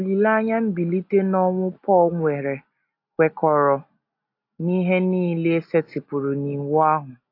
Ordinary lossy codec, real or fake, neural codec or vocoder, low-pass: AAC, 32 kbps; real; none; 5.4 kHz